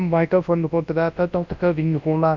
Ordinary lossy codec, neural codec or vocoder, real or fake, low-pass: none; codec, 24 kHz, 0.9 kbps, WavTokenizer, large speech release; fake; 7.2 kHz